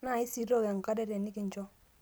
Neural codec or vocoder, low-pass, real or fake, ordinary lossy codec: none; none; real; none